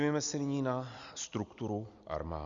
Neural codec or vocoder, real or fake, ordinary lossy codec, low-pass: none; real; Opus, 64 kbps; 7.2 kHz